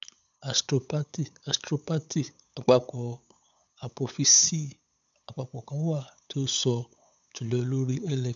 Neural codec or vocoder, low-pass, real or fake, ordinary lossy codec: codec, 16 kHz, 8 kbps, FunCodec, trained on LibriTTS, 25 frames a second; 7.2 kHz; fake; none